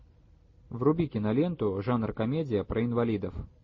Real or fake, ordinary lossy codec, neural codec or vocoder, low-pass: real; MP3, 32 kbps; none; 7.2 kHz